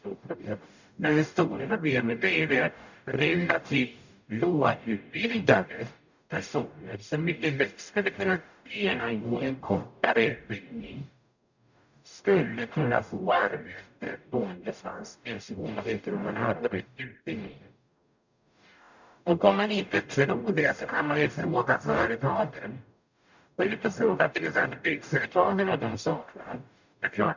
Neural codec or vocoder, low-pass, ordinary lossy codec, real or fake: codec, 44.1 kHz, 0.9 kbps, DAC; 7.2 kHz; none; fake